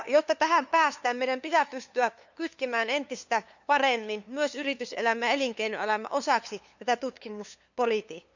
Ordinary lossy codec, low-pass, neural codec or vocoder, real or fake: none; 7.2 kHz; codec, 16 kHz, 2 kbps, FunCodec, trained on LibriTTS, 25 frames a second; fake